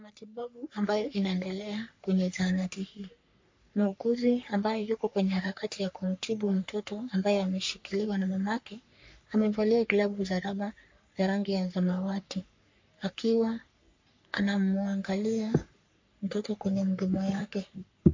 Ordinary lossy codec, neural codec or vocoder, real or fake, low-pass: MP3, 48 kbps; codec, 44.1 kHz, 3.4 kbps, Pupu-Codec; fake; 7.2 kHz